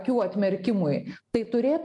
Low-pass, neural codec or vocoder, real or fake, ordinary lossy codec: 10.8 kHz; none; real; MP3, 96 kbps